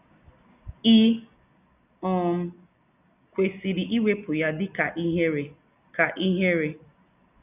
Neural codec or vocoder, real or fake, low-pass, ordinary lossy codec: none; real; 3.6 kHz; none